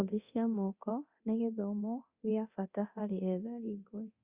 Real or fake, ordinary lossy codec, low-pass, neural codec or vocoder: fake; Opus, 64 kbps; 3.6 kHz; codec, 24 kHz, 0.9 kbps, DualCodec